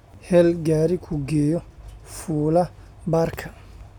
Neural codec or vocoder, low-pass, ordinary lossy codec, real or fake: none; 19.8 kHz; none; real